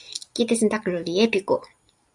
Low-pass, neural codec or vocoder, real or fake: 10.8 kHz; none; real